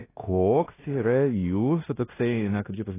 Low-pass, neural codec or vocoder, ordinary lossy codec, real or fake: 3.6 kHz; codec, 16 kHz in and 24 kHz out, 0.9 kbps, LongCat-Audio-Codec, fine tuned four codebook decoder; AAC, 16 kbps; fake